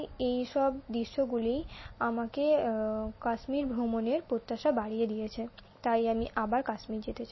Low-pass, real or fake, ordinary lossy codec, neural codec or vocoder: 7.2 kHz; real; MP3, 24 kbps; none